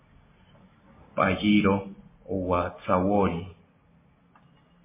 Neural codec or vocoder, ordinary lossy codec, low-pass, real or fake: none; MP3, 16 kbps; 3.6 kHz; real